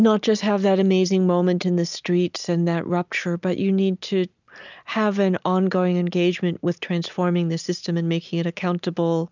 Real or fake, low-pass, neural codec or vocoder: real; 7.2 kHz; none